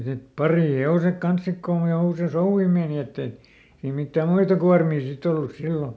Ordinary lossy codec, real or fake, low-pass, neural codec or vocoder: none; real; none; none